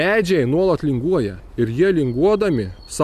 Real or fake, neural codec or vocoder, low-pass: real; none; 14.4 kHz